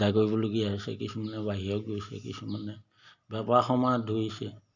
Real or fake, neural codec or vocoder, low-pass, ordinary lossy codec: real; none; 7.2 kHz; none